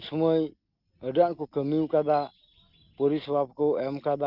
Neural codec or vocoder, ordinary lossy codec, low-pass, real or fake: none; Opus, 32 kbps; 5.4 kHz; real